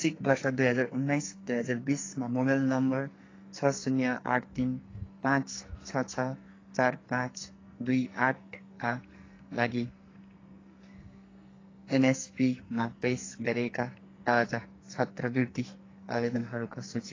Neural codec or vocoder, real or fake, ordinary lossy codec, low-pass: codec, 44.1 kHz, 2.6 kbps, SNAC; fake; AAC, 32 kbps; 7.2 kHz